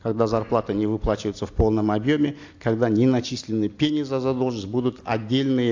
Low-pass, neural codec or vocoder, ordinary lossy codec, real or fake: 7.2 kHz; none; AAC, 48 kbps; real